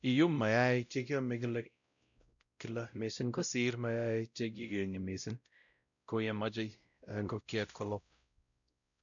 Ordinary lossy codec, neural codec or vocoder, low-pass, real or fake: none; codec, 16 kHz, 0.5 kbps, X-Codec, WavLM features, trained on Multilingual LibriSpeech; 7.2 kHz; fake